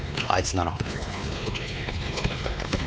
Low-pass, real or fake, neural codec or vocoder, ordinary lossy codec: none; fake; codec, 16 kHz, 2 kbps, X-Codec, WavLM features, trained on Multilingual LibriSpeech; none